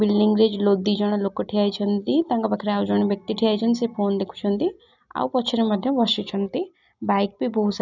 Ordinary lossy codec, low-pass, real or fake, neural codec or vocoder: none; 7.2 kHz; real; none